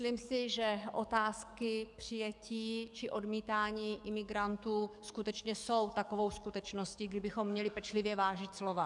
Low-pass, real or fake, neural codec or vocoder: 10.8 kHz; fake; codec, 44.1 kHz, 7.8 kbps, DAC